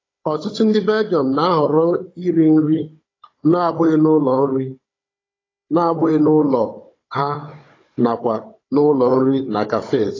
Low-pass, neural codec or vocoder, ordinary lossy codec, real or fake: 7.2 kHz; codec, 16 kHz, 16 kbps, FunCodec, trained on Chinese and English, 50 frames a second; AAC, 32 kbps; fake